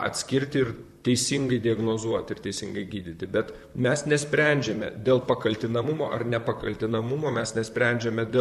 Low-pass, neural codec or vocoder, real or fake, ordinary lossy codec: 14.4 kHz; vocoder, 44.1 kHz, 128 mel bands, Pupu-Vocoder; fake; AAC, 96 kbps